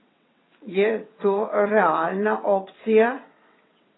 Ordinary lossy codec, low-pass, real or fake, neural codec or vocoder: AAC, 16 kbps; 7.2 kHz; fake; vocoder, 22.05 kHz, 80 mel bands, WaveNeXt